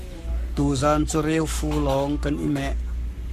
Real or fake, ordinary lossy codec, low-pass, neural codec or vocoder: fake; Opus, 64 kbps; 14.4 kHz; codec, 44.1 kHz, 7.8 kbps, Pupu-Codec